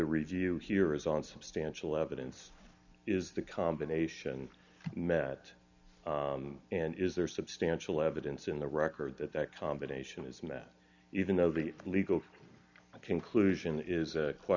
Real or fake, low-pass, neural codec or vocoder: real; 7.2 kHz; none